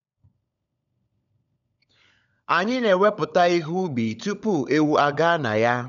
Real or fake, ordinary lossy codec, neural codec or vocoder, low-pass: fake; none; codec, 16 kHz, 16 kbps, FunCodec, trained on LibriTTS, 50 frames a second; 7.2 kHz